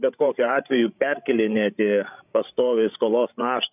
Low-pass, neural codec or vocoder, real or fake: 3.6 kHz; codec, 16 kHz, 16 kbps, FreqCodec, larger model; fake